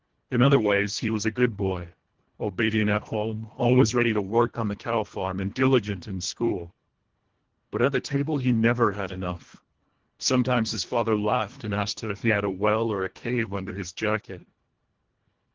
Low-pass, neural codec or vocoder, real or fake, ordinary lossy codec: 7.2 kHz; codec, 24 kHz, 1.5 kbps, HILCodec; fake; Opus, 16 kbps